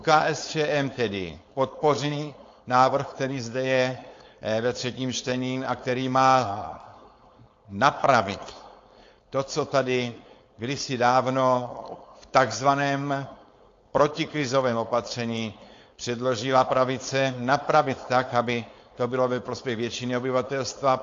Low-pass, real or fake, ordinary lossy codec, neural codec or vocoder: 7.2 kHz; fake; AAC, 48 kbps; codec, 16 kHz, 4.8 kbps, FACodec